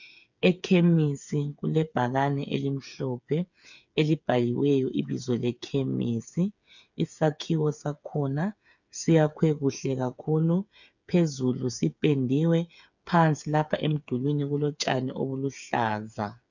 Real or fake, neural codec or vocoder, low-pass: fake; codec, 16 kHz, 8 kbps, FreqCodec, smaller model; 7.2 kHz